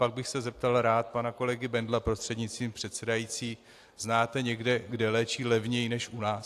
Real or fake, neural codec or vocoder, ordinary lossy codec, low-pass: real; none; AAC, 64 kbps; 14.4 kHz